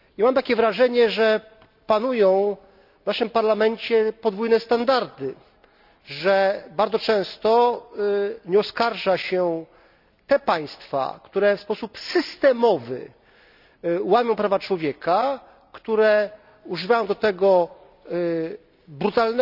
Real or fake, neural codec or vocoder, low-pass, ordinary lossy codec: real; none; 5.4 kHz; none